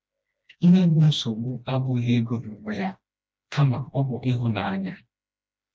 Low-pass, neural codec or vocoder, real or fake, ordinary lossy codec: none; codec, 16 kHz, 1 kbps, FreqCodec, smaller model; fake; none